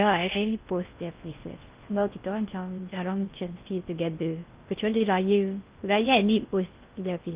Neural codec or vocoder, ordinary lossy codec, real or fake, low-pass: codec, 16 kHz in and 24 kHz out, 0.6 kbps, FocalCodec, streaming, 4096 codes; Opus, 24 kbps; fake; 3.6 kHz